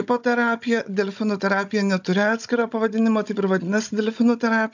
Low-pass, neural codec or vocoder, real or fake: 7.2 kHz; codec, 16 kHz, 16 kbps, FunCodec, trained on Chinese and English, 50 frames a second; fake